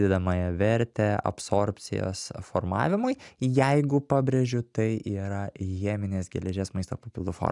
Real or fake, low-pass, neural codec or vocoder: real; 10.8 kHz; none